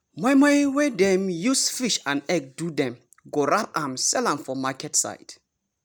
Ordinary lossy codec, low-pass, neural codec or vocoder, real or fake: none; none; none; real